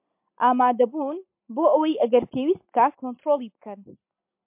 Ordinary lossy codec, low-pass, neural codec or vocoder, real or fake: MP3, 32 kbps; 3.6 kHz; none; real